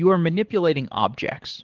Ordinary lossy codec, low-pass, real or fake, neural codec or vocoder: Opus, 16 kbps; 7.2 kHz; fake; codec, 24 kHz, 6 kbps, HILCodec